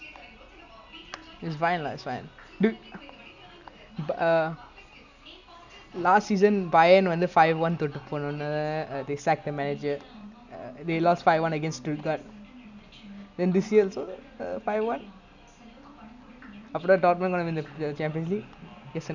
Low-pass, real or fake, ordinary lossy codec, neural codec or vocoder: 7.2 kHz; real; none; none